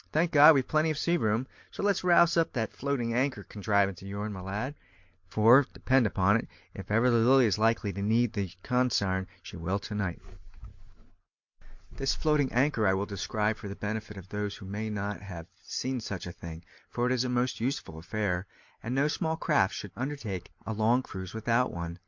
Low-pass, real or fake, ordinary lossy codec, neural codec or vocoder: 7.2 kHz; real; MP3, 48 kbps; none